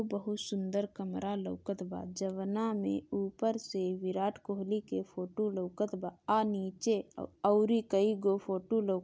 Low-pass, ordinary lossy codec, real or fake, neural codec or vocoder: none; none; real; none